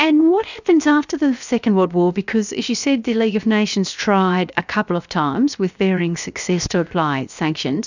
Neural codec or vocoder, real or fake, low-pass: codec, 16 kHz, 0.7 kbps, FocalCodec; fake; 7.2 kHz